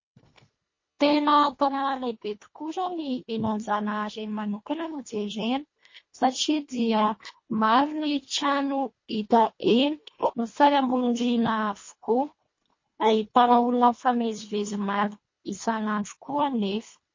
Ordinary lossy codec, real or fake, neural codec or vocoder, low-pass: MP3, 32 kbps; fake; codec, 24 kHz, 1.5 kbps, HILCodec; 7.2 kHz